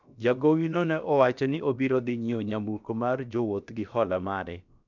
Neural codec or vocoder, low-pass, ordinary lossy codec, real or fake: codec, 16 kHz, about 1 kbps, DyCAST, with the encoder's durations; 7.2 kHz; none; fake